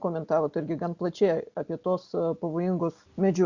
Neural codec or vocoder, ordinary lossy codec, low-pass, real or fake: none; Opus, 64 kbps; 7.2 kHz; real